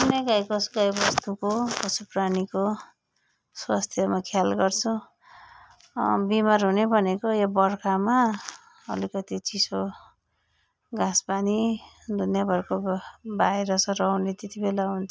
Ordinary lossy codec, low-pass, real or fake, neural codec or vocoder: none; none; real; none